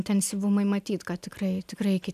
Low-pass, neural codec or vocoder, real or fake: 14.4 kHz; none; real